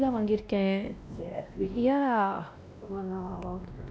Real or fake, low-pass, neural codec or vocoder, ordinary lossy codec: fake; none; codec, 16 kHz, 1 kbps, X-Codec, WavLM features, trained on Multilingual LibriSpeech; none